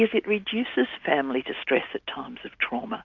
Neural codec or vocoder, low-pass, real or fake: none; 7.2 kHz; real